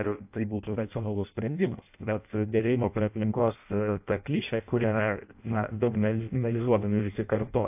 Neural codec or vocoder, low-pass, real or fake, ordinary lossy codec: codec, 16 kHz in and 24 kHz out, 0.6 kbps, FireRedTTS-2 codec; 3.6 kHz; fake; AAC, 32 kbps